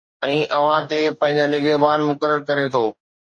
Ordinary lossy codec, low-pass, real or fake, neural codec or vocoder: MP3, 48 kbps; 9.9 kHz; fake; codec, 44.1 kHz, 2.6 kbps, DAC